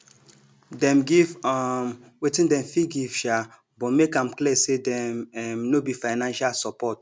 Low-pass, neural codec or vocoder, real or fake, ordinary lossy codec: none; none; real; none